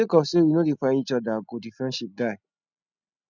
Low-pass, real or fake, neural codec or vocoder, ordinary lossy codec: 7.2 kHz; real; none; none